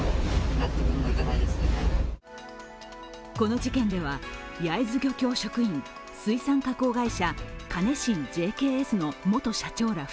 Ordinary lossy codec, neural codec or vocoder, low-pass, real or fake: none; none; none; real